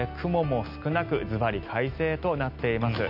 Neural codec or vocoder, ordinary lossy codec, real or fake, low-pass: none; none; real; 5.4 kHz